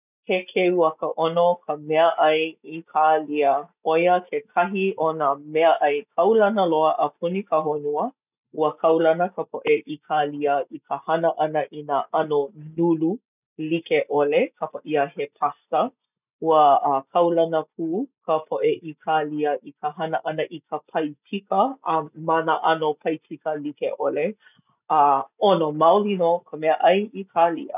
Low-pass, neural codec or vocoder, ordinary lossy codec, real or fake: 3.6 kHz; none; none; real